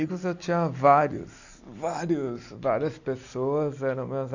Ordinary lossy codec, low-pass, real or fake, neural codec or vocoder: none; 7.2 kHz; real; none